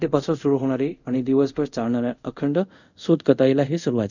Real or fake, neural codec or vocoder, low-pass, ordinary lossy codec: fake; codec, 24 kHz, 0.5 kbps, DualCodec; 7.2 kHz; none